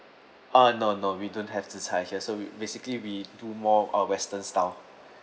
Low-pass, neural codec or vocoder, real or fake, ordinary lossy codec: none; none; real; none